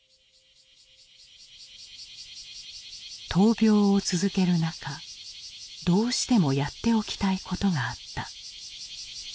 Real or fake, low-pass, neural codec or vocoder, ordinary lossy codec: real; none; none; none